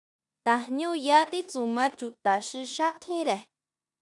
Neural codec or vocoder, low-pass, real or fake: codec, 16 kHz in and 24 kHz out, 0.9 kbps, LongCat-Audio-Codec, four codebook decoder; 10.8 kHz; fake